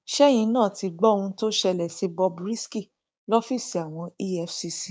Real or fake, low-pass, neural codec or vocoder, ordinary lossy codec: fake; none; codec, 16 kHz, 6 kbps, DAC; none